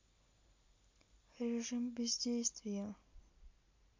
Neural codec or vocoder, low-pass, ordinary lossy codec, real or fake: none; 7.2 kHz; MP3, 48 kbps; real